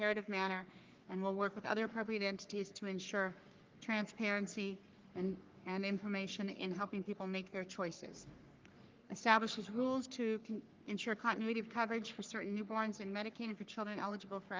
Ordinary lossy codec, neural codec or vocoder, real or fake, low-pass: Opus, 24 kbps; codec, 44.1 kHz, 3.4 kbps, Pupu-Codec; fake; 7.2 kHz